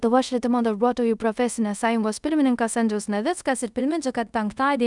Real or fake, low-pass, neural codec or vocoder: fake; 10.8 kHz; codec, 24 kHz, 0.5 kbps, DualCodec